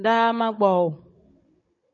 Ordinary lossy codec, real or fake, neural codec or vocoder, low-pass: MP3, 32 kbps; fake; codec, 16 kHz, 16 kbps, FunCodec, trained on Chinese and English, 50 frames a second; 7.2 kHz